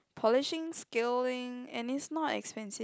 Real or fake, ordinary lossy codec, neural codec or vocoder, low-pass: real; none; none; none